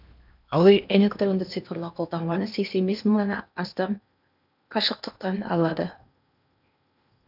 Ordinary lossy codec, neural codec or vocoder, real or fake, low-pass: none; codec, 16 kHz in and 24 kHz out, 0.8 kbps, FocalCodec, streaming, 65536 codes; fake; 5.4 kHz